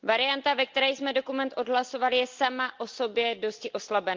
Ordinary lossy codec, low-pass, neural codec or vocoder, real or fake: Opus, 32 kbps; 7.2 kHz; none; real